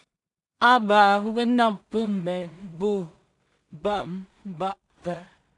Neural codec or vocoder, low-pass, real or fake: codec, 16 kHz in and 24 kHz out, 0.4 kbps, LongCat-Audio-Codec, two codebook decoder; 10.8 kHz; fake